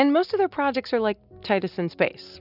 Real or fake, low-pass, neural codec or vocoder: real; 5.4 kHz; none